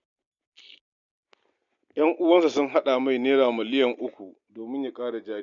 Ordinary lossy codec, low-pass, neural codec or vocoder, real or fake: none; 7.2 kHz; none; real